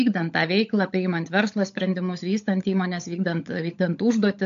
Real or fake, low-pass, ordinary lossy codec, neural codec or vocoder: fake; 7.2 kHz; AAC, 64 kbps; codec, 16 kHz, 16 kbps, FunCodec, trained on LibriTTS, 50 frames a second